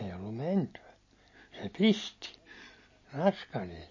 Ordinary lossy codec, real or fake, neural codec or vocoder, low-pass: MP3, 32 kbps; real; none; 7.2 kHz